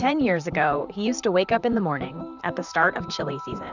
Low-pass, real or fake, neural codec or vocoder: 7.2 kHz; fake; vocoder, 44.1 kHz, 128 mel bands, Pupu-Vocoder